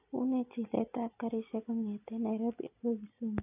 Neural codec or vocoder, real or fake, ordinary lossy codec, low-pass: none; real; AAC, 32 kbps; 3.6 kHz